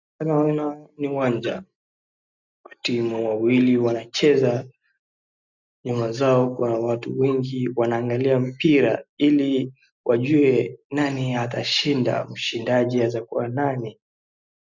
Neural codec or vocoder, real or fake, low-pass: none; real; 7.2 kHz